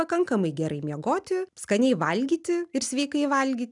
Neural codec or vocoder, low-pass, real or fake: none; 10.8 kHz; real